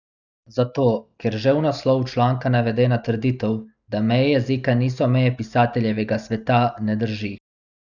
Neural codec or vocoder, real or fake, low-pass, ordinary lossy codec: none; real; 7.2 kHz; none